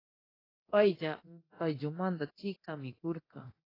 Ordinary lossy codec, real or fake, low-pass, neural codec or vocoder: AAC, 24 kbps; fake; 5.4 kHz; codec, 24 kHz, 0.9 kbps, DualCodec